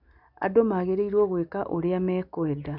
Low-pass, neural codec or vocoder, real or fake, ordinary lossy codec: 5.4 kHz; none; real; none